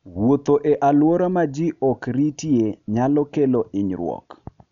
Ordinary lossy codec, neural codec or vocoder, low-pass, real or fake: none; none; 7.2 kHz; real